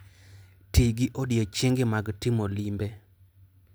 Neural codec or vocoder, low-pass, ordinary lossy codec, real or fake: none; none; none; real